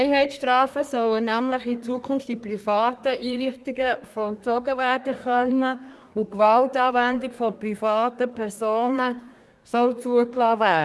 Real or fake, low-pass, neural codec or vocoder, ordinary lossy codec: fake; none; codec, 24 kHz, 1 kbps, SNAC; none